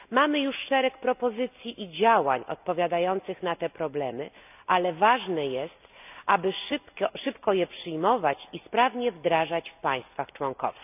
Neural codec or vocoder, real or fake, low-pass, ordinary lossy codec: none; real; 3.6 kHz; none